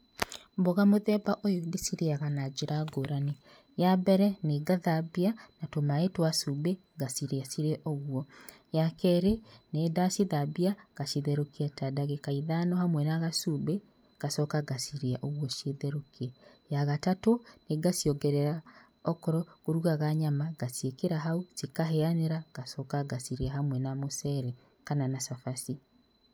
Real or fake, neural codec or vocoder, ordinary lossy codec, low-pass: real; none; none; none